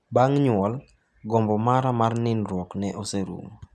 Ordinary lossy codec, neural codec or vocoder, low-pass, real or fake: none; none; none; real